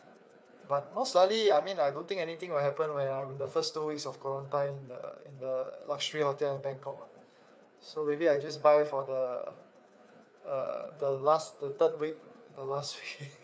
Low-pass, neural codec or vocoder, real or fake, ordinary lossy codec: none; codec, 16 kHz, 4 kbps, FreqCodec, larger model; fake; none